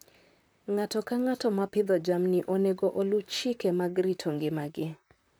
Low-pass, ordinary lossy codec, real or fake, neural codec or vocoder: none; none; fake; vocoder, 44.1 kHz, 128 mel bands, Pupu-Vocoder